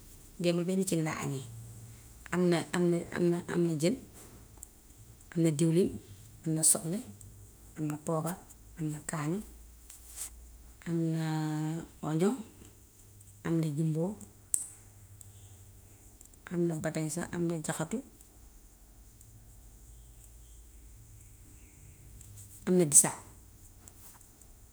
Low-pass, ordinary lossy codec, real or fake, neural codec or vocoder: none; none; fake; autoencoder, 48 kHz, 32 numbers a frame, DAC-VAE, trained on Japanese speech